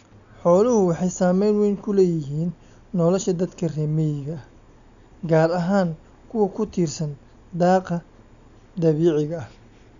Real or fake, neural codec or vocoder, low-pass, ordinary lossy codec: real; none; 7.2 kHz; none